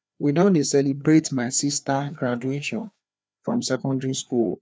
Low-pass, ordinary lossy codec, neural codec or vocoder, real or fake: none; none; codec, 16 kHz, 2 kbps, FreqCodec, larger model; fake